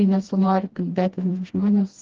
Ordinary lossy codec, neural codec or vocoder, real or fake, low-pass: Opus, 16 kbps; codec, 16 kHz, 1 kbps, FreqCodec, smaller model; fake; 7.2 kHz